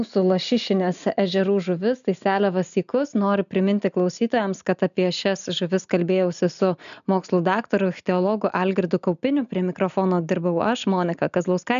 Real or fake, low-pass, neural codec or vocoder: real; 7.2 kHz; none